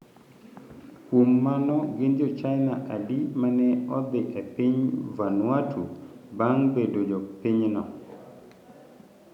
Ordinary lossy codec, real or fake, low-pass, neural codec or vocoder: none; real; 19.8 kHz; none